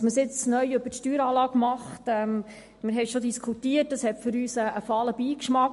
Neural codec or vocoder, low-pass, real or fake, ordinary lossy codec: none; 14.4 kHz; real; MP3, 48 kbps